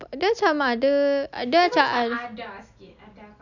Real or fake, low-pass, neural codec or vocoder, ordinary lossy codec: real; 7.2 kHz; none; none